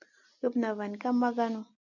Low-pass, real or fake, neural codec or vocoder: 7.2 kHz; real; none